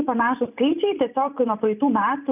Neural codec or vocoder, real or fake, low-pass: vocoder, 44.1 kHz, 128 mel bands every 512 samples, BigVGAN v2; fake; 3.6 kHz